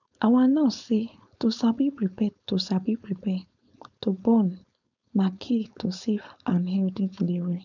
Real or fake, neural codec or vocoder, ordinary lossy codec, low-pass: fake; codec, 16 kHz, 4.8 kbps, FACodec; none; 7.2 kHz